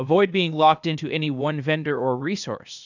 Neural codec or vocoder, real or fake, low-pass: codec, 16 kHz, 0.8 kbps, ZipCodec; fake; 7.2 kHz